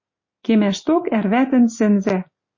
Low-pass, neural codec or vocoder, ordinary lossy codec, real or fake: 7.2 kHz; none; MP3, 32 kbps; real